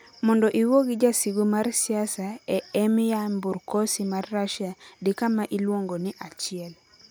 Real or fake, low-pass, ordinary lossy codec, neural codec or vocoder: real; none; none; none